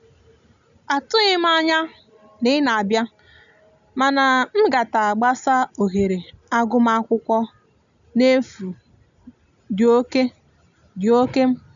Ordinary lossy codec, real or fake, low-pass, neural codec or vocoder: none; real; 7.2 kHz; none